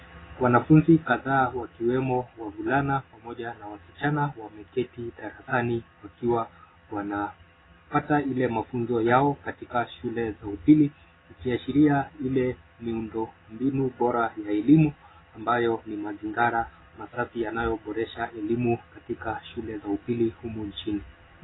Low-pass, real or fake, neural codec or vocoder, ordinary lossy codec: 7.2 kHz; real; none; AAC, 16 kbps